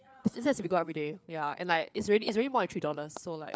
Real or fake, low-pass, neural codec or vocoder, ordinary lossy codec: fake; none; codec, 16 kHz, 4 kbps, FreqCodec, larger model; none